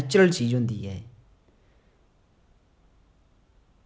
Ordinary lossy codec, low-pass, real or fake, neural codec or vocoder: none; none; real; none